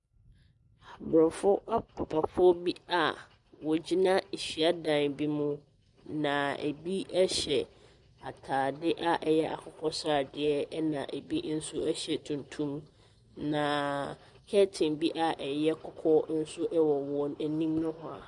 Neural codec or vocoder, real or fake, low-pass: none; real; 10.8 kHz